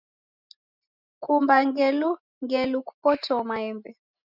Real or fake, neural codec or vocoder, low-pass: real; none; 5.4 kHz